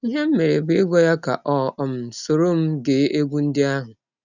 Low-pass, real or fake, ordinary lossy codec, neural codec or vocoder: 7.2 kHz; real; none; none